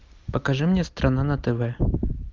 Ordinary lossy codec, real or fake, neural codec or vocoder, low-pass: Opus, 24 kbps; real; none; 7.2 kHz